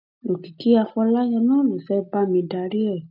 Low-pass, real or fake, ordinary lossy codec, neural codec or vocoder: 5.4 kHz; real; none; none